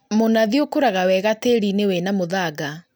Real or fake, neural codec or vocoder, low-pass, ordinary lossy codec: real; none; none; none